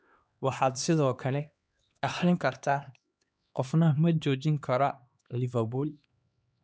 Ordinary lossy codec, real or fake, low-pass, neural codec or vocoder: none; fake; none; codec, 16 kHz, 2 kbps, X-Codec, HuBERT features, trained on LibriSpeech